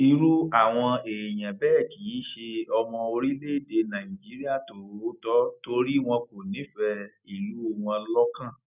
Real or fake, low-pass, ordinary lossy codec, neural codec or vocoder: real; 3.6 kHz; none; none